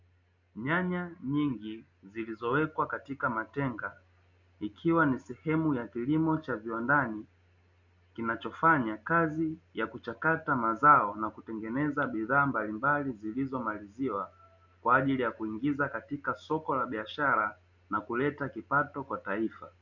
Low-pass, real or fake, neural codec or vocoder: 7.2 kHz; real; none